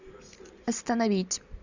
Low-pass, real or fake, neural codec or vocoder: 7.2 kHz; real; none